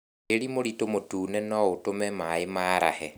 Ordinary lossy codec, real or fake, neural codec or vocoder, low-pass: none; real; none; none